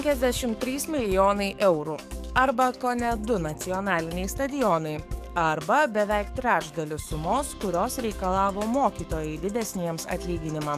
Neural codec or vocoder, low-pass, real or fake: codec, 44.1 kHz, 7.8 kbps, DAC; 14.4 kHz; fake